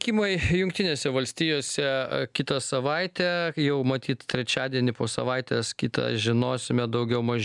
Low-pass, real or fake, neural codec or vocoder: 10.8 kHz; real; none